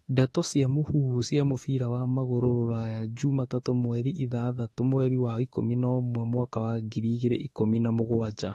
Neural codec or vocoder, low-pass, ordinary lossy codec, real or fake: autoencoder, 48 kHz, 32 numbers a frame, DAC-VAE, trained on Japanese speech; 19.8 kHz; AAC, 32 kbps; fake